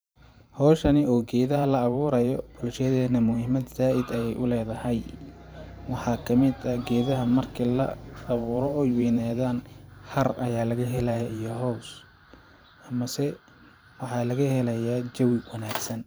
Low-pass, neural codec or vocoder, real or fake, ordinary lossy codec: none; vocoder, 44.1 kHz, 128 mel bands every 512 samples, BigVGAN v2; fake; none